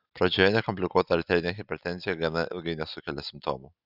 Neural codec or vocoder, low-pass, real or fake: none; 5.4 kHz; real